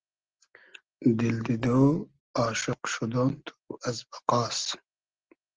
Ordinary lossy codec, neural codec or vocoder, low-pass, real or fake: Opus, 16 kbps; none; 7.2 kHz; real